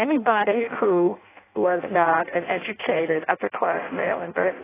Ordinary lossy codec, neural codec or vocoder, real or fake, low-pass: AAC, 16 kbps; codec, 16 kHz in and 24 kHz out, 0.6 kbps, FireRedTTS-2 codec; fake; 3.6 kHz